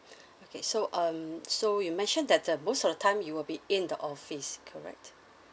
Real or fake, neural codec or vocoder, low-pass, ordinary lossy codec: real; none; none; none